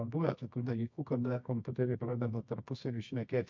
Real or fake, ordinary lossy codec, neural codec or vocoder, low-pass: fake; MP3, 48 kbps; codec, 24 kHz, 0.9 kbps, WavTokenizer, medium music audio release; 7.2 kHz